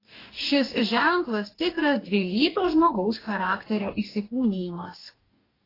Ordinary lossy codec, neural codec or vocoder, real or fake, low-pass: AAC, 24 kbps; codec, 44.1 kHz, 2.6 kbps, DAC; fake; 5.4 kHz